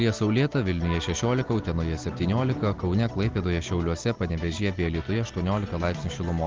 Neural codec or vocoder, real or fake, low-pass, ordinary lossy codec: none; real; 7.2 kHz; Opus, 16 kbps